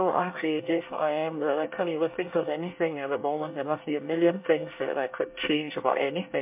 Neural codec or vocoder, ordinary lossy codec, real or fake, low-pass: codec, 24 kHz, 1 kbps, SNAC; MP3, 32 kbps; fake; 3.6 kHz